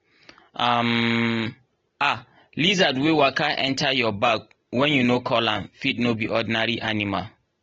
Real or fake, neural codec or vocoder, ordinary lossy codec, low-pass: real; none; AAC, 24 kbps; 7.2 kHz